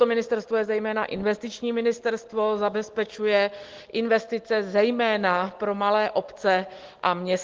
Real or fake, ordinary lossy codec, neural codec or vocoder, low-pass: real; Opus, 16 kbps; none; 7.2 kHz